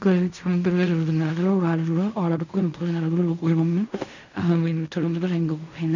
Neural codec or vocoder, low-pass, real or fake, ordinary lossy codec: codec, 16 kHz in and 24 kHz out, 0.4 kbps, LongCat-Audio-Codec, fine tuned four codebook decoder; 7.2 kHz; fake; none